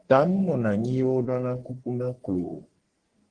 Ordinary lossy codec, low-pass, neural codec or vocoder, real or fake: Opus, 16 kbps; 9.9 kHz; codec, 44.1 kHz, 3.4 kbps, Pupu-Codec; fake